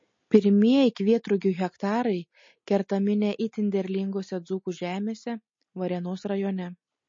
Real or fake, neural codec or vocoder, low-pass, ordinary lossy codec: real; none; 7.2 kHz; MP3, 32 kbps